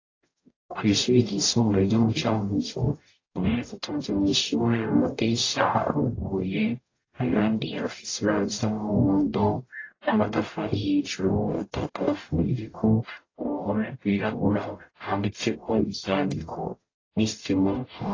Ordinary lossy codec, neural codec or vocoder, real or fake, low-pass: AAC, 32 kbps; codec, 44.1 kHz, 0.9 kbps, DAC; fake; 7.2 kHz